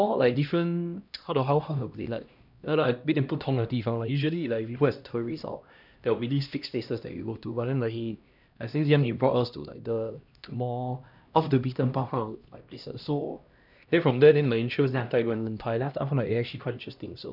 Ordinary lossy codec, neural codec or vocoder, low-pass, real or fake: none; codec, 16 kHz, 1 kbps, X-Codec, HuBERT features, trained on LibriSpeech; 5.4 kHz; fake